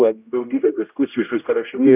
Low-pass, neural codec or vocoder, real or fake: 3.6 kHz; codec, 16 kHz, 0.5 kbps, X-Codec, HuBERT features, trained on general audio; fake